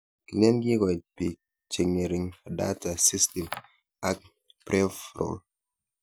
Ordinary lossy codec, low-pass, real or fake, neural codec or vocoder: none; none; real; none